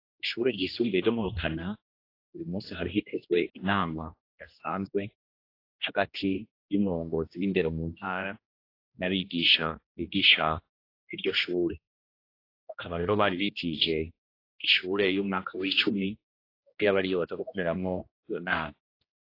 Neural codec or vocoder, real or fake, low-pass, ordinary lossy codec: codec, 16 kHz, 1 kbps, X-Codec, HuBERT features, trained on general audio; fake; 5.4 kHz; AAC, 32 kbps